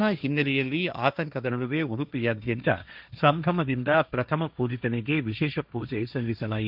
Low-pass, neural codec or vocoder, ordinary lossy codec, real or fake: 5.4 kHz; codec, 16 kHz, 1.1 kbps, Voila-Tokenizer; none; fake